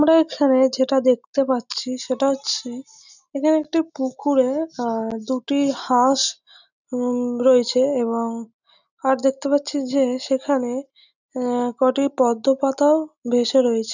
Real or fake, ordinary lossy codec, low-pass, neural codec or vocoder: real; none; 7.2 kHz; none